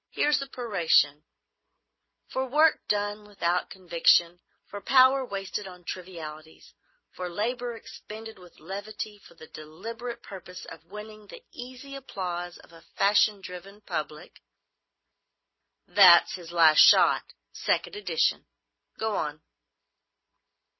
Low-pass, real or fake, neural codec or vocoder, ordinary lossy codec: 7.2 kHz; real; none; MP3, 24 kbps